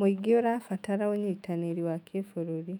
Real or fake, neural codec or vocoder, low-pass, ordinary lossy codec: fake; autoencoder, 48 kHz, 128 numbers a frame, DAC-VAE, trained on Japanese speech; 19.8 kHz; none